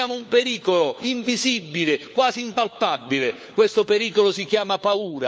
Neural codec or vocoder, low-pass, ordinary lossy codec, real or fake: codec, 16 kHz, 4 kbps, FunCodec, trained on LibriTTS, 50 frames a second; none; none; fake